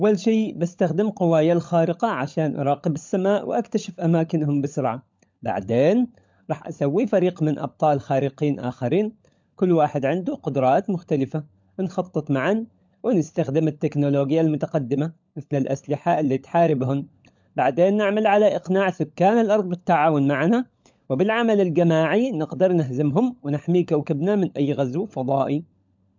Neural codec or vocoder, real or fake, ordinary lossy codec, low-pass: codec, 16 kHz, 16 kbps, FunCodec, trained on LibriTTS, 50 frames a second; fake; MP3, 64 kbps; 7.2 kHz